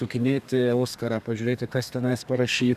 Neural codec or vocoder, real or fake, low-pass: codec, 32 kHz, 1.9 kbps, SNAC; fake; 14.4 kHz